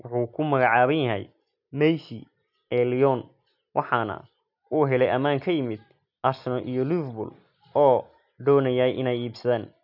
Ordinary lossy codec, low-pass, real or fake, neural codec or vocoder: none; 5.4 kHz; real; none